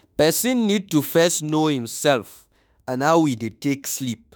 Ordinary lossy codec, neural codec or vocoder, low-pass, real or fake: none; autoencoder, 48 kHz, 32 numbers a frame, DAC-VAE, trained on Japanese speech; none; fake